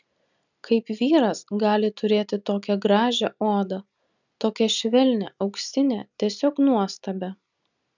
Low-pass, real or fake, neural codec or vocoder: 7.2 kHz; real; none